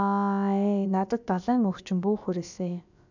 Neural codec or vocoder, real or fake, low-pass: codec, 16 kHz, about 1 kbps, DyCAST, with the encoder's durations; fake; 7.2 kHz